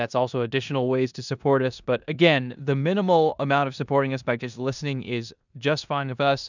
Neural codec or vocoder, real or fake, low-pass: codec, 16 kHz in and 24 kHz out, 0.9 kbps, LongCat-Audio-Codec, four codebook decoder; fake; 7.2 kHz